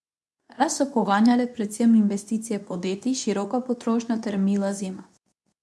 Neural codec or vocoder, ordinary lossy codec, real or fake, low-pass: codec, 24 kHz, 0.9 kbps, WavTokenizer, medium speech release version 2; none; fake; none